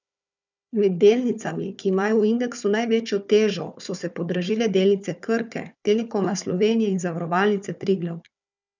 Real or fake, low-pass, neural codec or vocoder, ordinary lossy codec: fake; 7.2 kHz; codec, 16 kHz, 4 kbps, FunCodec, trained on Chinese and English, 50 frames a second; none